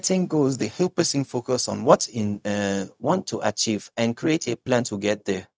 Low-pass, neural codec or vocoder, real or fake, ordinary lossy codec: none; codec, 16 kHz, 0.4 kbps, LongCat-Audio-Codec; fake; none